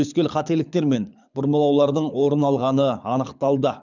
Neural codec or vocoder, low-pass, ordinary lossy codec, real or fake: codec, 24 kHz, 6 kbps, HILCodec; 7.2 kHz; none; fake